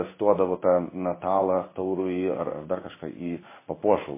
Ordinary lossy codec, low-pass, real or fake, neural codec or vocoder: MP3, 16 kbps; 3.6 kHz; fake; codec, 16 kHz in and 24 kHz out, 1 kbps, XY-Tokenizer